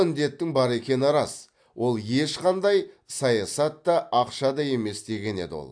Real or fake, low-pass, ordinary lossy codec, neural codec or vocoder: real; 9.9 kHz; AAC, 64 kbps; none